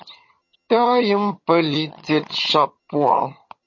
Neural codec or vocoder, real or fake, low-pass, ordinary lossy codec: vocoder, 22.05 kHz, 80 mel bands, HiFi-GAN; fake; 7.2 kHz; MP3, 32 kbps